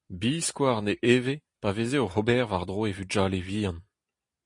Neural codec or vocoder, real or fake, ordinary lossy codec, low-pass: none; real; MP3, 48 kbps; 10.8 kHz